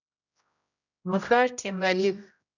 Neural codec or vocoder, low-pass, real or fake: codec, 16 kHz, 0.5 kbps, X-Codec, HuBERT features, trained on general audio; 7.2 kHz; fake